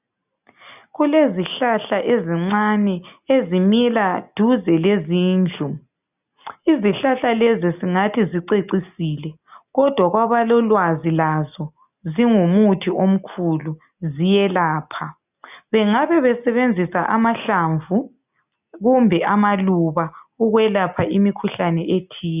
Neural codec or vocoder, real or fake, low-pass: none; real; 3.6 kHz